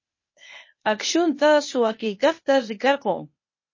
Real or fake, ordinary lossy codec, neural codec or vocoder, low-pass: fake; MP3, 32 kbps; codec, 16 kHz, 0.8 kbps, ZipCodec; 7.2 kHz